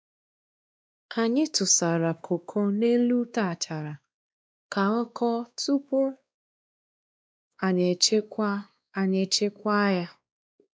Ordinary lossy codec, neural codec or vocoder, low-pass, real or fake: none; codec, 16 kHz, 2 kbps, X-Codec, WavLM features, trained on Multilingual LibriSpeech; none; fake